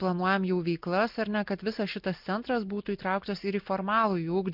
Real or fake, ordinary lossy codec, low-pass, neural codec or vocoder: real; MP3, 48 kbps; 5.4 kHz; none